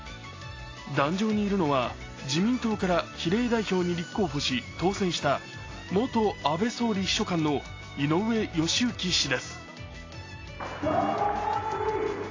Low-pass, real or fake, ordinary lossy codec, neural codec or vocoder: 7.2 kHz; real; AAC, 32 kbps; none